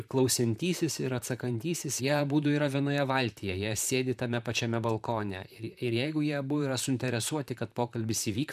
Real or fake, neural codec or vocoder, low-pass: fake; vocoder, 44.1 kHz, 128 mel bands, Pupu-Vocoder; 14.4 kHz